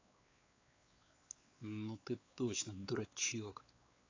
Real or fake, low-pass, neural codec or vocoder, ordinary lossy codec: fake; 7.2 kHz; codec, 16 kHz, 4 kbps, X-Codec, WavLM features, trained on Multilingual LibriSpeech; AAC, 32 kbps